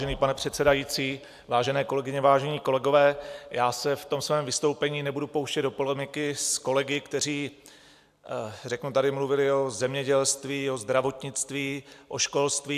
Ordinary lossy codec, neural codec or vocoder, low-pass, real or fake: AAC, 96 kbps; none; 14.4 kHz; real